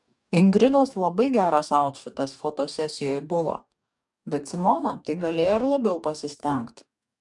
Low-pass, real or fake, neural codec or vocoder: 10.8 kHz; fake; codec, 44.1 kHz, 2.6 kbps, DAC